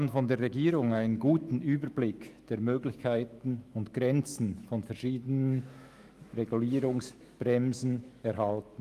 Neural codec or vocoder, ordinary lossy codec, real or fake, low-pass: autoencoder, 48 kHz, 128 numbers a frame, DAC-VAE, trained on Japanese speech; Opus, 32 kbps; fake; 14.4 kHz